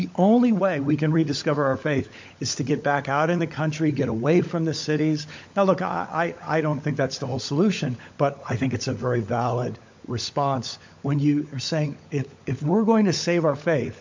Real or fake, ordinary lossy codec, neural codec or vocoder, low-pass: fake; MP3, 48 kbps; codec, 16 kHz, 16 kbps, FunCodec, trained on LibriTTS, 50 frames a second; 7.2 kHz